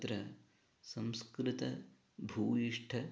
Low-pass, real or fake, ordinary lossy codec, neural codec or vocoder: none; real; none; none